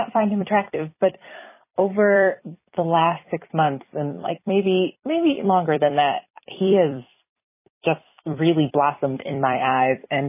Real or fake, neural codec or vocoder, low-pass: fake; vocoder, 44.1 kHz, 128 mel bands every 256 samples, BigVGAN v2; 3.6 kHz